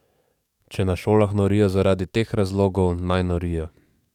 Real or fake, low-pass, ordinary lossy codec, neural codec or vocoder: fake; 19.8 kHz; none; codec, 44.1 kHz, 7.8 kbps, DAC